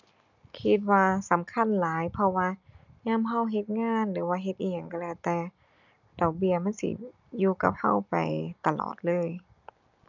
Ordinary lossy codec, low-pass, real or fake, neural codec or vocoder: none; 7.2 kHz; real; none